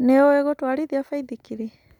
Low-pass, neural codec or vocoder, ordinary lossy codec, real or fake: 19.8 kHz; none; none; real